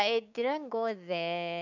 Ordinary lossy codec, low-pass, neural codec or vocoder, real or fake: none; 7.2 kHz; codec, 16 kHz, 6 kbps, DAC; fake